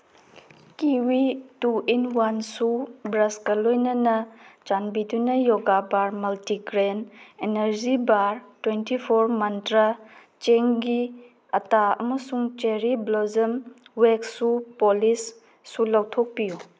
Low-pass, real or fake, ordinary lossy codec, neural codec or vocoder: none; real; none; none